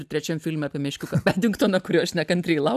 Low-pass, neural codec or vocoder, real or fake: 14.4 kHz; none; real